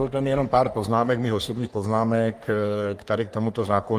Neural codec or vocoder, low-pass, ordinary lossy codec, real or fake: codec, 44.1 kHz, 3.4 kbps, Pupu-Codec; 14.4 kHz; Opus, 32 kbps; fake